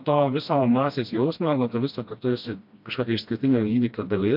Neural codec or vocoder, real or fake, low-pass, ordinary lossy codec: codec, 16 kHz, 1 kbps, FreqCodec, smaller model; fake; 5.4 kHz; MP3, 48 kbps